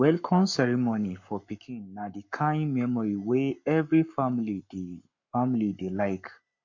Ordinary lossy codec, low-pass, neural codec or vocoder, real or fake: MP3, 48 kbps; 7.2 kHz; codec, 44.1 kHz, 7.8 kbps, Pupu-Codec; fake